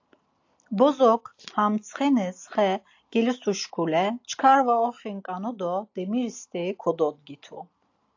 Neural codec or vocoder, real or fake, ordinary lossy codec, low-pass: none; real; AAC, 48 kbps; 7.2 kHz